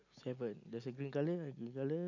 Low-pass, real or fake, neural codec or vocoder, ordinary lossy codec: 7.2 kHz; real; none; none